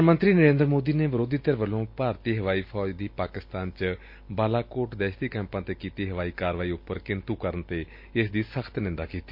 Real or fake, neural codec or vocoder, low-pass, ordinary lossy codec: real; none; 5.4 kHz; MP3, 32 kbps